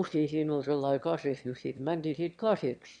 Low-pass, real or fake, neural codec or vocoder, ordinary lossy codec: 9.9 kHz; fake; autoencoder, 22.05 kHz, a latent of 192 numbers a frame, VITS, trained on one speaker; none